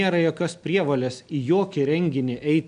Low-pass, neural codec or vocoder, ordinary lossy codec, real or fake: 9.9 kHz; none; MP3, 96 kbps; real